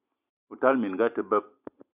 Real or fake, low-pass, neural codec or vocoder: real; 3.6 kHz; none